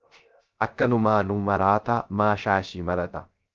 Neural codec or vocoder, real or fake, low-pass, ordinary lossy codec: codec, 16 kHz, 0.3 kbps, FocalCodec; fake; 7.2 kHz; Opus, 24 kbps